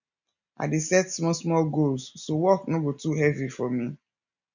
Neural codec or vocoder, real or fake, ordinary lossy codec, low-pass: none; real; none; 7.2 kHz